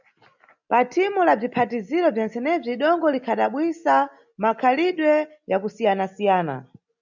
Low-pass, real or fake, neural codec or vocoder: 7.2 kHz; real; none